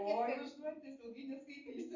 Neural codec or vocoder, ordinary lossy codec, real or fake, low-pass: none; Opus, 64 kbps; real; 7.2 kHz